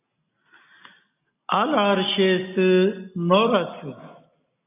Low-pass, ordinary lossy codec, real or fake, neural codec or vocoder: 3.6 kHz; AAC, 24 kbps; real; none